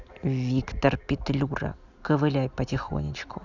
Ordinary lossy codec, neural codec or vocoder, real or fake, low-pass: none; none; real; 7.2 kHz